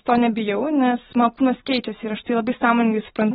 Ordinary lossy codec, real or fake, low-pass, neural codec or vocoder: AAC, 16 kbps; real; 14.4 kHz; none